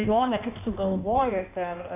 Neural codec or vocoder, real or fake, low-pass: codec, 16 kHz in and 24 kHz out, 1.1 kbps, FireRedTTS-2 codec; fake; 3.6 kHz